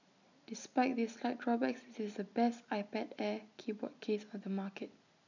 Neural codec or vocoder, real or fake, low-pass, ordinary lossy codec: none; real; 7.2 kHz; none